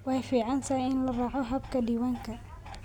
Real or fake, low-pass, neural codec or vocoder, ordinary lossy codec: real; 19.8 kHz; none; none